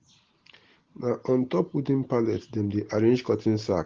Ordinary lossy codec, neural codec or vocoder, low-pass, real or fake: Opus, 16 kbps; none; 7.2 kHz; real